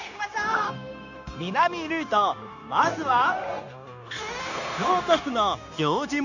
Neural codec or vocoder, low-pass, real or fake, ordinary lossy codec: codec, 16 kHz, 0.9 kbps, LongCat-Audio-Codec; 7.2 kHz; fake; none